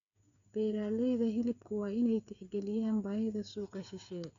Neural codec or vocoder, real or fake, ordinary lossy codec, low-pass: codec, 16 kHz, 8 kbps, FreqCodec, smaller model; fake; none; 7.2 kHz